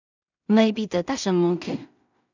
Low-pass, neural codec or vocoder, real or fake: 7.2 kHz; codec, 16 kHz in and 24 kHz out, 0.4 kbps, LongCat-Audio-Codec, two codebook decoder; fake